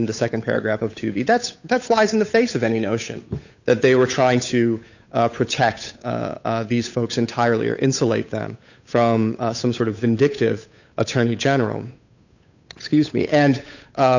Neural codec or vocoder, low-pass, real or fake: codec, 16 kHz, 8 kbps, FunCodec, trained on Chinese and English, 25 frames a second; 7.2 kHz; fake